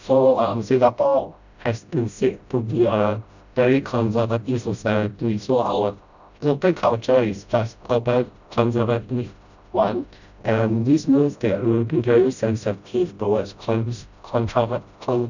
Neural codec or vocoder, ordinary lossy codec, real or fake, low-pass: codec, 16 kHz, 0.5 kbps, FreqCodec, smaller model; none; fake; 7.2 kHz